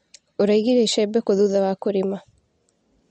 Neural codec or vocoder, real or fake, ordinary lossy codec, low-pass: none; real; MP3, 48 kbps; 10.8 kHz